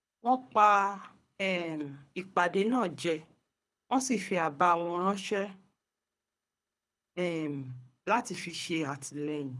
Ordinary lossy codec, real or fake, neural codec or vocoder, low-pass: none; fake; codec, 24 kHz, 3 kbps, HILCodec; none